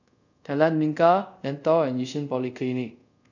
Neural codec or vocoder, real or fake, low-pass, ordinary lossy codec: codec, 24 kHz, 0.5 kbps, DualCodec; fake; 7.2 kHz; none